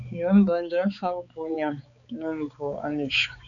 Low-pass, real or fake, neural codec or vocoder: 7.2 kHz; fake; codec, 16 kHz, 4 kbps, X-Codec, HuBERT features, trained on balanced general audio